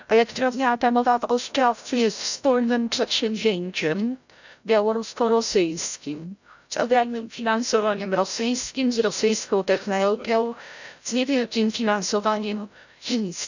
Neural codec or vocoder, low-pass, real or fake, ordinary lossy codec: codec, 16 kHz, 0.5 kbps, FreqCodec, larger model; 7.2 kHz; fake; none